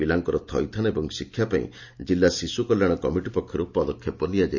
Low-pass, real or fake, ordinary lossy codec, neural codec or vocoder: 7.2 kHz; real; none; none